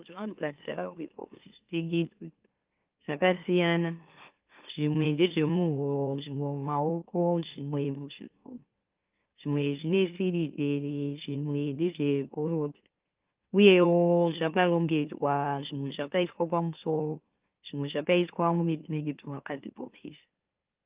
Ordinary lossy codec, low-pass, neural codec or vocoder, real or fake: Opus, 24 kbps; 3.6 kHz; autoencoder, 44.1 kHz, a latent of 192 numbers a frame, MeloTTS; fake